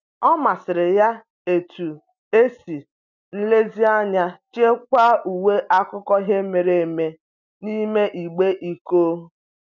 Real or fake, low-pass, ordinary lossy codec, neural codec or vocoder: real; 7.2 kHz; none; none